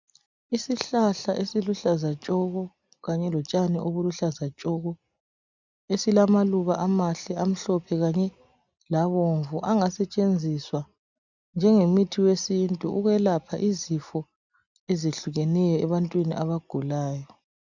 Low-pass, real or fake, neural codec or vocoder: 7.2 kHz; real; none